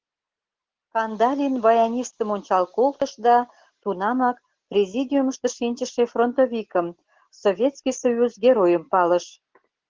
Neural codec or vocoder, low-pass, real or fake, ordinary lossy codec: none; 7.2 kHz; real; Opus, 16 kbps